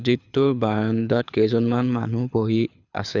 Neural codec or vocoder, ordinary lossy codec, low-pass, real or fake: codec, 24 kHz, 6 kbps, HILCodec; none; 7.2 kHz; fake